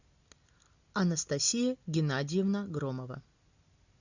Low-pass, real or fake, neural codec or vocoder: 7.2 kHz; real; none